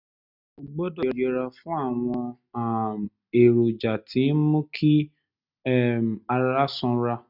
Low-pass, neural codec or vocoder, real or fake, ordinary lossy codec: 5.4 kHz; none; real; none